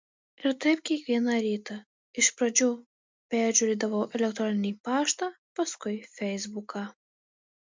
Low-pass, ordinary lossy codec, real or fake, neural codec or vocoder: 7.2 kHz; MP3, 64 kbps; real; none